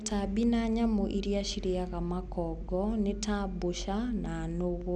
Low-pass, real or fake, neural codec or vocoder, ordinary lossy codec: none; real; none; none